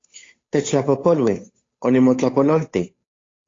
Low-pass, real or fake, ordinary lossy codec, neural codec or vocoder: 7.2 kHz; fake; AAC, 32 kbps; codec, 16 kHz, 8 kbps, FunCodec, trained on Chinese and English, 25 frames a second